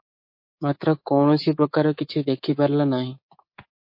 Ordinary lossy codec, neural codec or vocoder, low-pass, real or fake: MP3, 32 kbps; codec, 24 kHz, 3.1 kbps, DualCodec; 5.4 kHz; fake